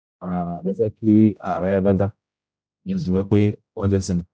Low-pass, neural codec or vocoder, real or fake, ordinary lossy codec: none; codec, 16 kHz, 0.5 kbps, X-Codec, HuBERT features, trained on general audio; fake; none